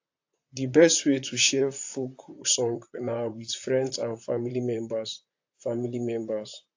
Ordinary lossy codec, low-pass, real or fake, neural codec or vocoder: AAC, 48 kbps; 7.2 kHz; fake; vocoder, 44.1 kHz, 128 mel bands, Pupu-Vocoder